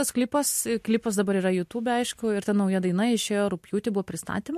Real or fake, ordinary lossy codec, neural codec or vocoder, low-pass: real; MP3, 64 kbps; none; 14.4 kHz